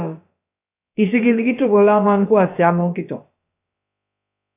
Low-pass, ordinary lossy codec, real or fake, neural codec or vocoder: 3.6 kHz; AAC, 32 kbps; fake; codec, 16 kHz, about 1 kbps, DyCAST, with the encoder's durations